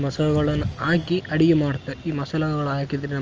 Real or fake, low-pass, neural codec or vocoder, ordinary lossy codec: real; 7.2 kHz; none; Opus, 24 kbps